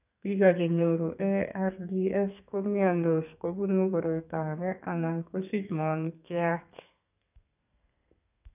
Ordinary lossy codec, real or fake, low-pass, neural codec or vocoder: none; fake; 3.6 kHz; codec, 32 kHz, 1.9 kbps, SNAC